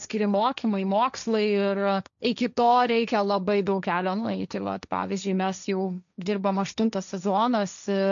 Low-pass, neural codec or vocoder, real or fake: 7.2 kHz; codec, 16 kHz, 1.1 kbps, Voila-Tokenizer; fake